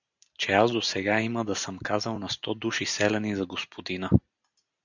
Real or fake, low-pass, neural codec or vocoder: real; 7.2 kHz; none